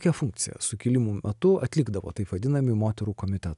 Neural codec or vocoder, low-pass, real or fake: none; 10.8 kHz; real